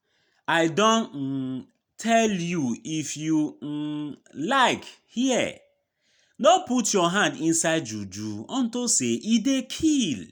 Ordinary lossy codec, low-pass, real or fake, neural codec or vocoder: none; none; real; none